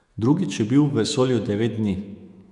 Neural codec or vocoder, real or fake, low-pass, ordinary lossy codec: autoencoder, 48 kHz, 128 numbers a frame, DAC-VAE, trained on Japanese speech; fake; 10.8 kHz; none